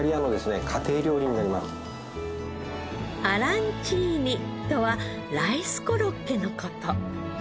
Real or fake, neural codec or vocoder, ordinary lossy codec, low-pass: real; none; none; none